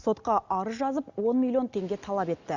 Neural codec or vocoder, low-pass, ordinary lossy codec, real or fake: none; 7.2 kHz; Opus, 64 kbps; real